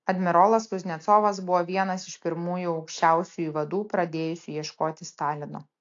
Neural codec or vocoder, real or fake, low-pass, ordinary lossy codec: none; real; 7.2 kHz; AAC, 48 kbps